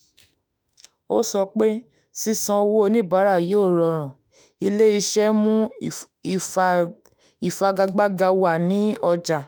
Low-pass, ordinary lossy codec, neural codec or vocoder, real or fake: none; none; autoencoder, 48 kHz, 32 numbers a frame, DAC-VAE, trained on Japanese speech; fake